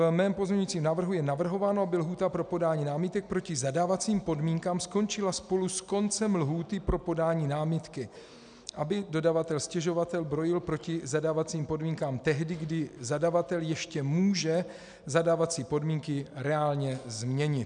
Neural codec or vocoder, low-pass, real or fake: none; 9.9 kHz; real